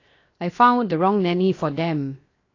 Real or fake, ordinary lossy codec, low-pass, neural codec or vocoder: fake; AAC, 32 kbps; 7.2 kHz; codec, 16 kHz, 0.7 kbps, FocalCodec